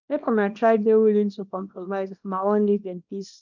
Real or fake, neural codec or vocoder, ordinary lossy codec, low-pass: fake; codec, 24 kHz, 0.9 kbps, WavTokenizer, small release; none; 7.2 kHz